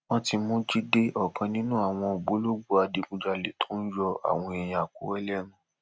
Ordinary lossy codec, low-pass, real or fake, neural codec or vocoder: none; none; real; none